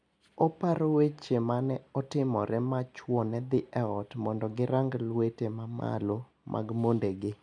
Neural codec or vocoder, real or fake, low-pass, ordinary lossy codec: none; real; 9.9 kHz; none